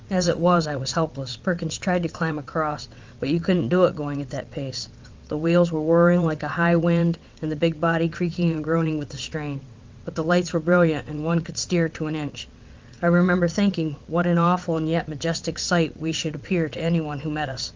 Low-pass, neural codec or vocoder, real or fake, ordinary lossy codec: 7.2 kHz; vocoder, 22.05 kHz, 80 mel bands, WaveNeXt; fake; Opus, 24 kbps